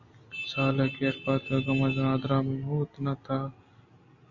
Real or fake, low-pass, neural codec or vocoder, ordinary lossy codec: real; 7.2 kHz; none; Opus, 64 kbps